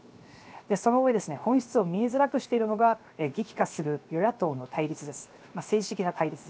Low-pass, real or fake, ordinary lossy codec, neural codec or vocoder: none; fake; none; codec, 16 kHz, 0.7 kbps, FocalCodec